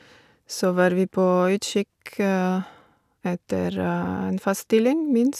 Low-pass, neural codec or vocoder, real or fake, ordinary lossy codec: 14.4 kHz; none; real; none